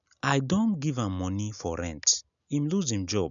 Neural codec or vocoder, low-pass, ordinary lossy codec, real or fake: none; 7.2 kHz; none; real